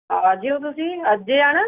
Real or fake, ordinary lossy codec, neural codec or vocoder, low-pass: real; Opus, 64 kbps; none; 3.6 kHz